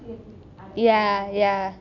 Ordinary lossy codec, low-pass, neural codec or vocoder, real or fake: none; 7.2 kHz; none; real